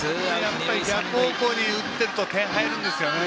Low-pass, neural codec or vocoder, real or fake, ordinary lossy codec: none; none; real; none